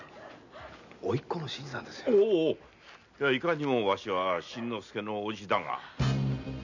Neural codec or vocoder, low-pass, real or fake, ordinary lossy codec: none; 7.2 kHz; real; none